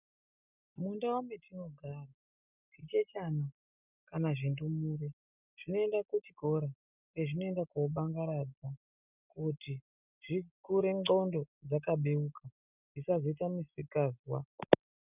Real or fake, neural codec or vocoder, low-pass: real; none; 3.6 kHz